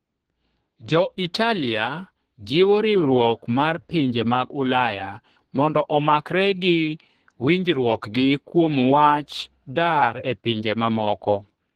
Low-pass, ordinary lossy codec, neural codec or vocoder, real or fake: 14.4 kHz; Opus, 16 kbps; codec, 32 kHz, 1.9 kbps, SNAC; fake